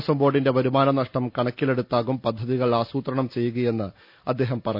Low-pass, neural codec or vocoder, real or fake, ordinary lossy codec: 5.4 kHz; none; real; none